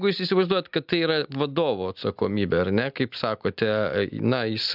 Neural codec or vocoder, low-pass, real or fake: none; 5.4 kHz; real